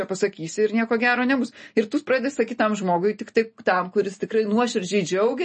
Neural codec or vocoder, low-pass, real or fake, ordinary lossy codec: none; 10.8 kHz; real; MP3, 32 kbps